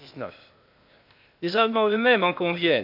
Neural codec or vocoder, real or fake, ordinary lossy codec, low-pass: codec, 16 kHz, 0.8 kbps, ZipCodec; fake; none; 5.4 kHz